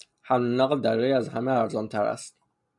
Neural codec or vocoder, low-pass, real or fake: none; 10.8 kHz; real